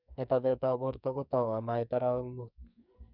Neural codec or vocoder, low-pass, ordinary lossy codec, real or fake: codec, 44.1 kHz, 2.6 kbps, SNAC; 5.4 kHz; none; fake